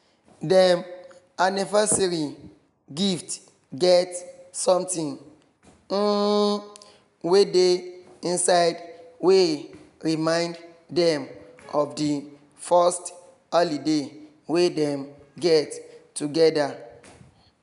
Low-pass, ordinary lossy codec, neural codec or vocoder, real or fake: 10.8 kHz; none; none; real